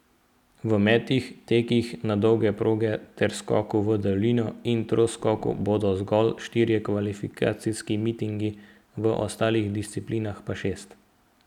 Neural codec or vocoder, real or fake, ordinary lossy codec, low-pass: none; real; none; 19.8 kHz